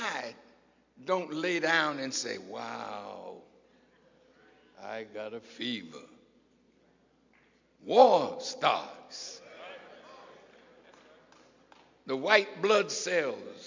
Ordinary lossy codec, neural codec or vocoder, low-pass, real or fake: MP3, 64 kbps; none; 7.2 kHz; real